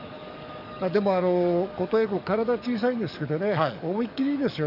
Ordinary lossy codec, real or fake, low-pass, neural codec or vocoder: none; fake; 5.4 kHz; codec, 44.1 kHz, 7.8 kbps, DAC